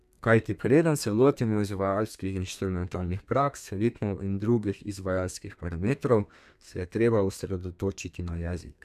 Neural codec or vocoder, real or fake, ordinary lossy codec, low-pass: codec, 32 kHz, 1.9 kbps, SNAC; fake; none; 14.4 kHz